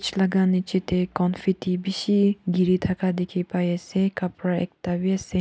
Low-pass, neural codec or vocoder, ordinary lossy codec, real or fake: none; none; none; real